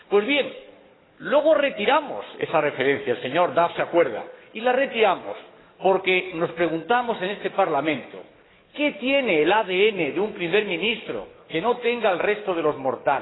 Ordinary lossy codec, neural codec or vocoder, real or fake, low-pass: AAC, 16 kbps; codec, 44.1 kHz, 7.8 kbps, DAC; fake; 7.2 kHz